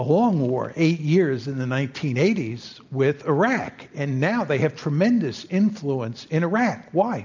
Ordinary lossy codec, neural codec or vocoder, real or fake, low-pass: MP3, 64 kbps; none; real; 7.2 kHz